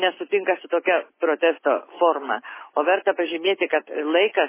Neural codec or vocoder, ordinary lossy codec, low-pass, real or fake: none; MP3, 16 kbps; 3.6 kHz; real